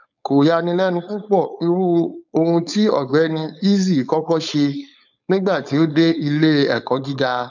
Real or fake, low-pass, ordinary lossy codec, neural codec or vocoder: fake; 7.2 kHz; none; codec, 16 kHz, 4.8 kbps, FACodec